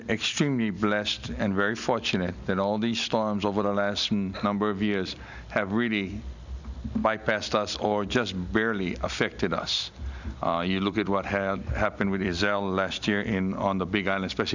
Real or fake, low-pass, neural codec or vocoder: real; 7.2 kHz; none